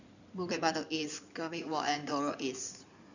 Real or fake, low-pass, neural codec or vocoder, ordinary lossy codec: fake; 7.2 kHz; codec, 16 kHz in and 24 kHz out, 2.2 kbps, FireRedTTS-2 codec; none